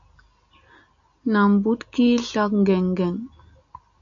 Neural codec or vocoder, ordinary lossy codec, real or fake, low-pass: none; MP3, 64 kbps; real; 7.2 kHz